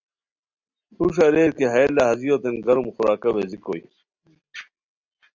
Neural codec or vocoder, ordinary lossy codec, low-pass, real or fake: none; Opus, 64 kbps; 7.2 kHz; real